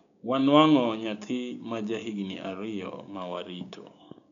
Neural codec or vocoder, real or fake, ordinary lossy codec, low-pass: codec, 16 kHz, 6 kbps, DAC; fake; none; 7.2 kHz